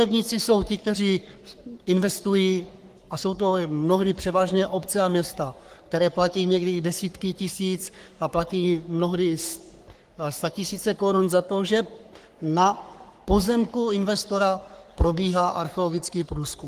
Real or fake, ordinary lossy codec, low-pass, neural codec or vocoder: fake; Opus, 24 kbps; 14.4 kHz; codec, 44.1 kHz, 3.4 kbps, Pupu-Codec